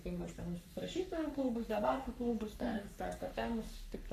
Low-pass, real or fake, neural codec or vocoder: 14.4 kHz; fake; codec, 44.1 kHz, 3.4 kbps, Pupu-Codec